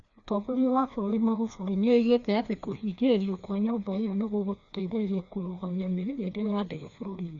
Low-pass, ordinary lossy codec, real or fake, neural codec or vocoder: 7.2 kHz; Opus, 64 kbps; fake; codec, 16 kHz, 2 kbps, FreqCodec, larger model